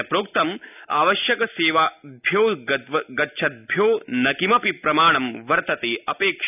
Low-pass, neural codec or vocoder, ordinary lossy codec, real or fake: 3.6 kHz; none; none; real